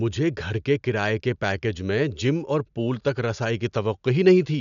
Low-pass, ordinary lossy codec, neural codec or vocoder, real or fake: 7.2 kHz; none; none; real